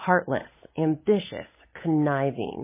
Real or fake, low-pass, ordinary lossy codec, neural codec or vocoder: fake; 3.6 kHz; MP3, 16 kbps; codec, 16 kHz, 8 kbps, FunCodec, trained on Chinese and English, 25 frames a second